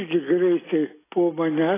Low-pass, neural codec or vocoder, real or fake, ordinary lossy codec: 3.6 kHz; none; real; AAC, 16 kbps